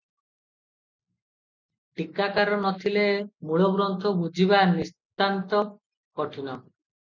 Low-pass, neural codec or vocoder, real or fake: 7.2 kHz; none; real